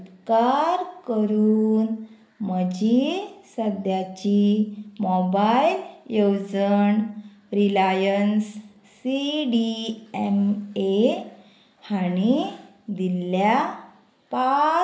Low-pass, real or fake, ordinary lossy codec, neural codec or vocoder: none; real; none; none